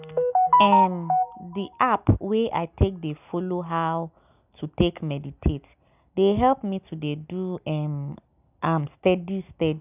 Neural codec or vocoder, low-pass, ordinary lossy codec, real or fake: none; 3.6 kHz; none; real